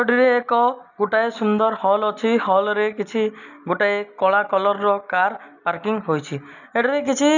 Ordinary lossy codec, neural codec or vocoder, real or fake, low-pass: none; none; real; 7.2 kHz